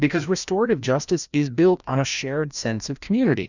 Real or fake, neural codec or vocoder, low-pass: fake; codec, 16 kHz, 1 kbps, FreqCodec, larger model; 7.2 kHz